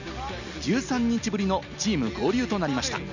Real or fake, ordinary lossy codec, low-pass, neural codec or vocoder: real; none; 7.2 kHz; none